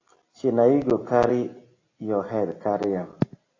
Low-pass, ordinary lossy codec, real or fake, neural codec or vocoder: 7.2 kHz; AAC, 32 kbps; real; none